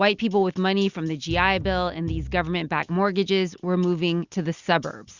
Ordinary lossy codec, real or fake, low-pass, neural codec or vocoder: Opus, 64 kbps; real; 7.2 kHz; none